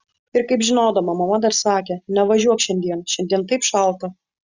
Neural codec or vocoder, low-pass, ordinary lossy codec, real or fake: none; 7.2 kHz; Opus, 64 kbps; real